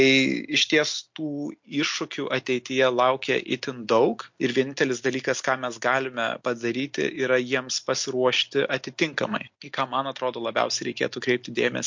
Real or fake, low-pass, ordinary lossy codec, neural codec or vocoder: real; 7.2 kHz; MP3, 48 kbps; none